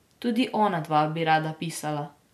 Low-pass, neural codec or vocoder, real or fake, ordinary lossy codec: 14.4 kHz; none; real; MP3, 96 kbps